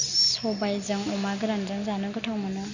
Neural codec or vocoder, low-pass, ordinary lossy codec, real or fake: none; 7.2 kHz; none; real